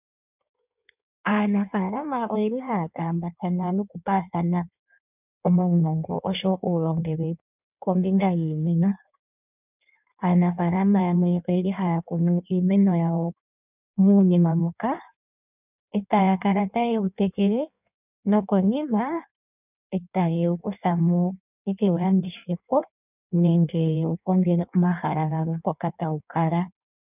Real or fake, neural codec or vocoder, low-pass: fake; codec, 16 kHz in and 24 kHz out, 1.1 kbps, FireRedTTS-2 codec; 3.6 kHz